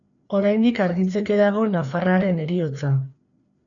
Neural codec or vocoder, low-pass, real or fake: codec, 16 kHz, 2 kbps, FreqCodec, larger model; 7.2 kHz; fake